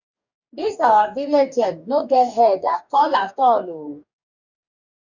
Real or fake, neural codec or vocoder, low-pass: fake; codec, 44.1 kHz, 2.6 kbps, DAC; 7.2 kHz